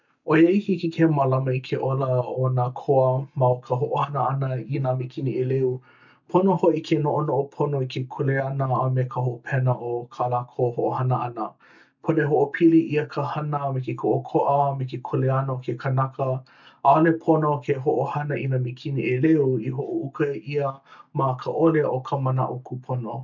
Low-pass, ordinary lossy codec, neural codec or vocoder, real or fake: 7.2 kHz; none; none; real